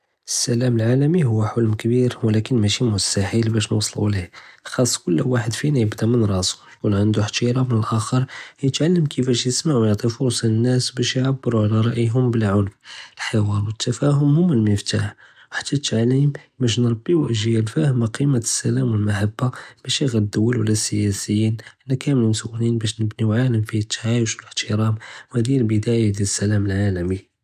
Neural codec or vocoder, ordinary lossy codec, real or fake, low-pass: none; none; real; 14.4 kHz